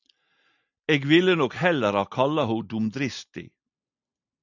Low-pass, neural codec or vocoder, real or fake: 7.2 kHz; none; real